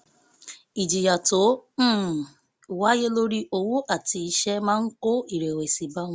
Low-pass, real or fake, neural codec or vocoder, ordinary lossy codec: none; real; none; none